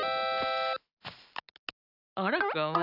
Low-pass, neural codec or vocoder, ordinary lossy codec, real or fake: 5.4 kHz; none; none; real